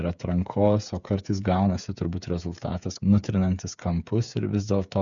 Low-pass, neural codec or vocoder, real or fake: 7.2 kHz; codec, 16 kHz, 8 kbps, FreqCodec, smaller model; fake